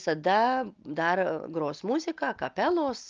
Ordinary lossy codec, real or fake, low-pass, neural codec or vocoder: Opus, 24 kbps; real; 7.2 kHz; none